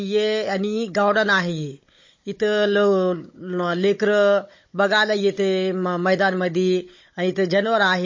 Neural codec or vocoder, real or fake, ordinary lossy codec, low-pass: codec, 16 kHz, 16 kbps, FunCodec, trained on Chinese and English, 50 frames a second; fake; MP3, 32 kbps; 7.2 kHz